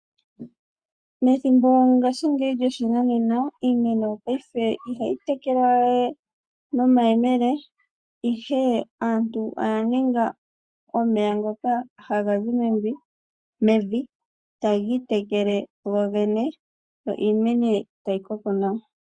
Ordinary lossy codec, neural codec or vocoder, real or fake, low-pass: Opus, 64 kbps; codec, 44.1 kHz, 7.8 kbps, Pupu-Codec; fake; 9.9 kHz